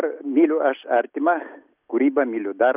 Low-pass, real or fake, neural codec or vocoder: 3.6 kHz; real; none